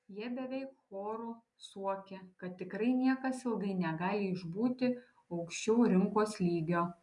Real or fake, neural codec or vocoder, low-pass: real; none; 10.8 kHz